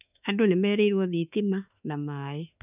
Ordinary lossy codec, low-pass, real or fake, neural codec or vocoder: none; 3.6 kHz; fake; autoencoder, 48 kHz, 32 numbers a frame, DAC-VAE, trained on Japanese speech